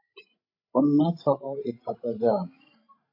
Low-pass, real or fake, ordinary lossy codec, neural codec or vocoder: 5.4 kHz; fake; AAC, 32 kbps; codec, 16 kHz, 16 kbps, FreqCodec, larger model